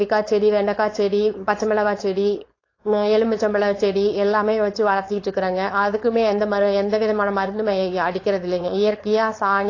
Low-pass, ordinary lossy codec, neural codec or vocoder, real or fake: 7.2 kHz; AAC, 32 kbps; codec, 16 kHz, 4.8 kbps, FACodec; fake